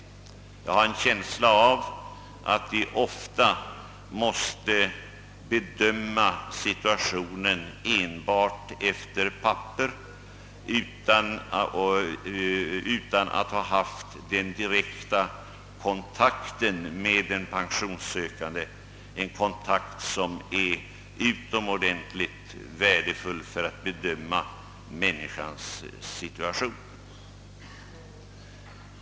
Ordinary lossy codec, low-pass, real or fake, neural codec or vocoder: none; none; real; none